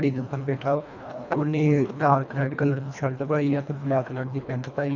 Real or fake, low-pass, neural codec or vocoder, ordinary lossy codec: fake; 7.2 kHz; codec, 24 kHz, 1.5 kbps, HILCodec; none